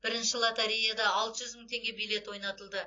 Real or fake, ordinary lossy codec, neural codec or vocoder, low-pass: real; AAC, 32 kbps; none; 7.2 kHz